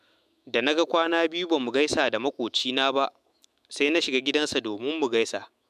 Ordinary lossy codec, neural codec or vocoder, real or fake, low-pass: MP3, 96 kbps; autoencoder, 48 kHz, 128 numbers a frame, DAC-VAE, trained on Japanese speech; fake; 14.4 kHz